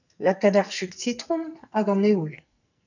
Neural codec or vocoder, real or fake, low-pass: codec, 44.1 kHz, 2.6 kbps, SNAC; fake; 7.2 kHz